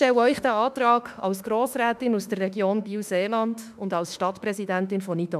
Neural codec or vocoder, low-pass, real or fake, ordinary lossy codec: autoencoder, 48 kHz, 32 numbers a frame, DAC-VAE, trained on Japanese speech; 14.4 kHz; fake; none